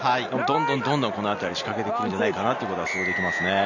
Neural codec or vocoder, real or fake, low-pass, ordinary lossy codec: none; real; 7.2 kHz; none